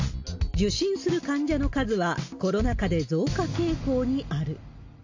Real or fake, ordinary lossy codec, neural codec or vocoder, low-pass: real; AAC, 48 kbps; none; 7.2 kHz